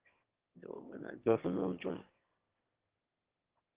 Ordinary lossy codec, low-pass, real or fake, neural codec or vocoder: Opus, 16 kbps; 3.6 kHz; fake; autoencoder, 22.05 kHz, a latent of 192 numbers a frame, VITS, trained on one speaker